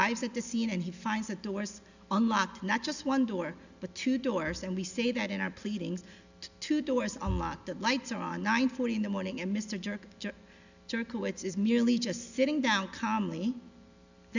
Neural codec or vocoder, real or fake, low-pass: none; real; 7.2 kHz